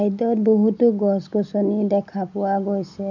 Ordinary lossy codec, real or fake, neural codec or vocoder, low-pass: none; real; none; 7.2 kHz